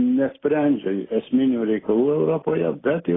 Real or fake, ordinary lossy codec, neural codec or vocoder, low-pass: real; AAC, 16 kbps; none; 7.2 kHz